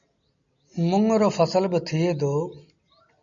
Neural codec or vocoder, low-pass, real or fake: none; 7.2 kHz; real